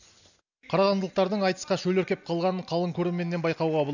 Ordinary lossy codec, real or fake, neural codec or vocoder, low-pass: none; real; none; 7.2 kHz